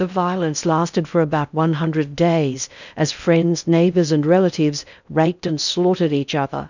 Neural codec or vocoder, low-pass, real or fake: codec, 16 kHz in and 24 kHz out, 0.6 kbps, FocalCodec, streaming, 2048 codes; 7.2 kHz; fake